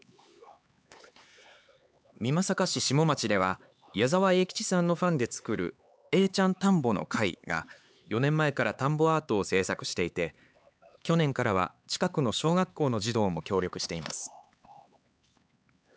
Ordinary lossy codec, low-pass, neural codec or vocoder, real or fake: none; none; codec, 16 kHz, 4 kbps, X-Codec, HuBERT features, trained on LibriSpeech; fake